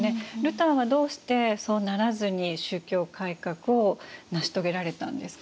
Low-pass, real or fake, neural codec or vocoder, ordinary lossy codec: none; real; none; none